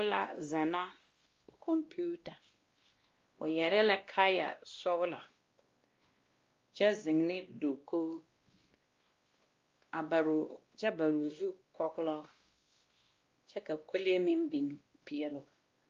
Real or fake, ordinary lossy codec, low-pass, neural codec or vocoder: fake; Opus, 32 kbps; 7.2 kHz; codec, 16 kHz, 1 kbps, X-Codec, WavLM features, trained on Multilingual LibriSpeech